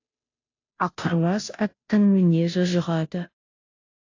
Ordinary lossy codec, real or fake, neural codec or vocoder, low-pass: AAC, 48 kbps; fake; codec, 16 kHz, 0.5 kbps, FunCodec, trained on Chinese and English, 25 frames a second; 7.2 kHz